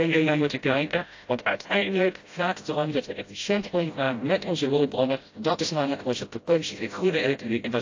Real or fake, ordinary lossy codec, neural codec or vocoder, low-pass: fake; AAC, 48 kbps; codec, 16 kHz, 0.5 kbps, FreqCodec, smaller model; 7.2 kHz